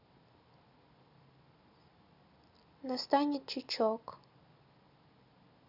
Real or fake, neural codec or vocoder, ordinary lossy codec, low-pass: real; none; none; 5.4 kHz